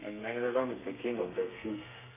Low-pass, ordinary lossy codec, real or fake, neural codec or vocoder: 3.6 kHz; none; fake; codec, 32 kHz, 1.9 kbps, SNAC